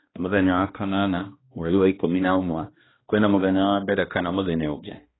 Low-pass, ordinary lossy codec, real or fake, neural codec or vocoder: 7.2 kHz; AAC, 16 kbps; fake; codec, 16 kHz, 1 kbps, X-Codec, HuBERT features, trained on balanced general audio